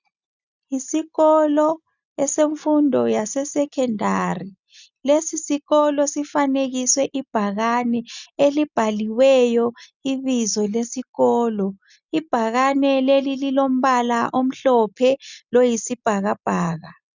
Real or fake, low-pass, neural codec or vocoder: real; 7.2 kHz; none